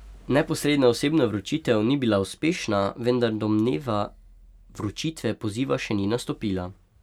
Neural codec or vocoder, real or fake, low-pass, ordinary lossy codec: none; real; 19.8 kHz; none